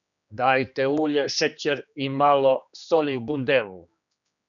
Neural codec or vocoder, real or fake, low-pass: codec, 16 kHz, 2 kbps, X-Codec, HuBERT features, trained on general audio; fake; 7.2 kHz